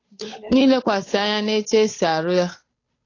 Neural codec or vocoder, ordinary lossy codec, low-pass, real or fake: codec, 16 kHz, 8 kbps, FunCodec, trained on Chinese and English, 25 frames a second; AAC, 32 kbps; 7.2 kHz; fake